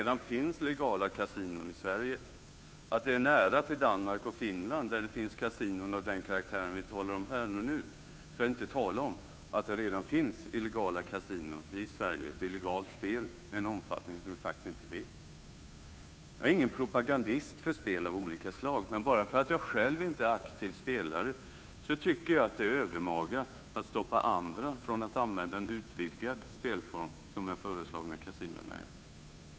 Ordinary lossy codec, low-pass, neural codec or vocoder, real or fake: none; none; codec, 16 kHz, 2 kbps, FunCodec, trained on Chinese and English, 25 frames a second; fake